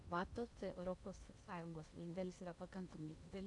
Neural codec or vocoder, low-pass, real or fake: codec, 16 kHz in and 24 kHz out, 0.8 kbps, FocalCodec, streaming, 65536 codes; 10.8 kHz; fake